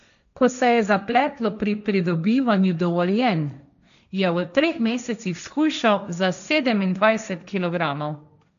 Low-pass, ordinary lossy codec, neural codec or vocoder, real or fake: 7.2 kHz; none; codec, 16 kHz, 1.1 kbps, Voila-Tokenizer; fake